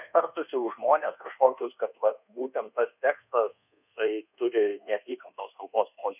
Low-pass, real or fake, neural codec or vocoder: 3.6 kHz; fake; codec, 24 kHz, 1.2 kbps, DualCodec